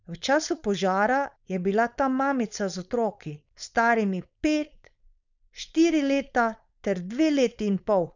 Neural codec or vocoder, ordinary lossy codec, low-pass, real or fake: codec, 16 kHz, 4.8 kbps, FACodec; none; 7.2 kHz; fake